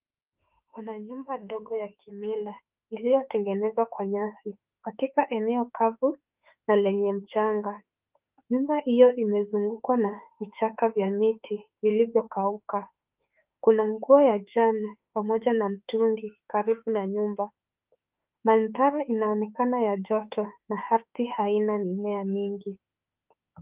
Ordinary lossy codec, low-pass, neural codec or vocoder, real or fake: Opus, 32 kbps; 3.6 kHz; autoencoder, 48 kHz, 32 numbers a frame, DAC-VAE, trained on Japanese speech; fake